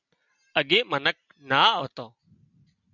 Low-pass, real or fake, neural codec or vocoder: 7.2 kHz; real; none